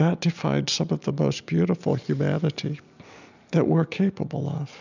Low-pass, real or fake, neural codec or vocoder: 7.2 kHz; real; none